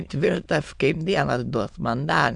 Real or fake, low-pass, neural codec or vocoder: fake; 9.9 kHz; autoencoder, 22.05 kHz, a latent of 192 numbers a frame, VITS, trained on many speakers